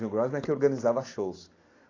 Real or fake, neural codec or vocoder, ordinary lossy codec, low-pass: real; none; AAC, 32 kbps; 7.2 kHz